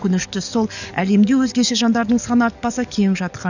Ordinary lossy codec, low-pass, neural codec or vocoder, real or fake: none; 7.2 kHz; codec, 44.1 kHz, 7.8 kbps, DAC; fake